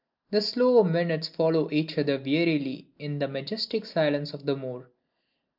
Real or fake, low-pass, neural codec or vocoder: real; 5.4 kHz; none